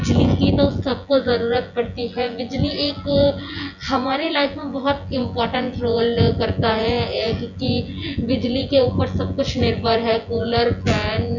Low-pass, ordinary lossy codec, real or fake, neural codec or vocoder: 7.2 kHz; none; fake; vocoder, 24 kHz, 100 mel bands, Vocos